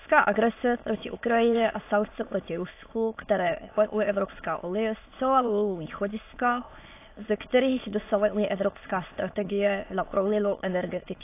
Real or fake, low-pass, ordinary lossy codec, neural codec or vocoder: fake; 3.6 kHz; AAC, 24 kbps; autoencoder, 22.05 kHz, a latent of 192 numbers a frame, VITS, trained on many speakers